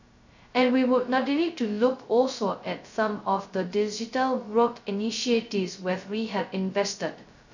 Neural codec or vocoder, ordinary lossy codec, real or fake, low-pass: codec, 16 kHz, 0.2 kbps, FocalCodec; none; fake; 7.2 kHz